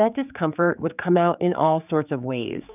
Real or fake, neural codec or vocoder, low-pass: fake; codec, 16 kHz, 4 kbps, X-Codec, HuBERT features, trained on general audio; 3.6 kHz